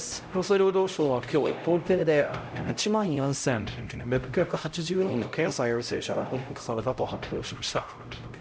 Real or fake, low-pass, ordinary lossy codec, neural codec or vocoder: fake; none; none; codec, 16 kHz, 0.5 kbps, X-Codec, HuBERT features, trained on LibriSpeech